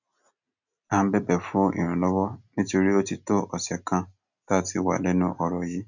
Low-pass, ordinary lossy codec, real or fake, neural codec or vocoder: 7.2 kHz; none; real; none